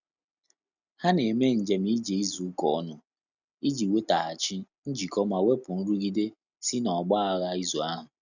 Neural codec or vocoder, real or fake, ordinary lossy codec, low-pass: none; real; none; 7.2 kHz